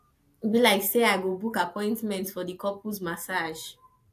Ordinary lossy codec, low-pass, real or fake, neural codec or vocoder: AAC, 64 kbps; 14.4 kHz; real; none